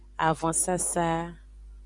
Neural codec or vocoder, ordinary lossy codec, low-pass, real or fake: none; Opus, 64 kbps; 10.8 kHz; real